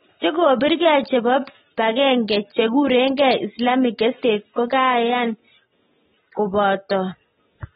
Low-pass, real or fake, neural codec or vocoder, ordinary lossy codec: 19.8 kHz; real; none; AAC, 16 kbps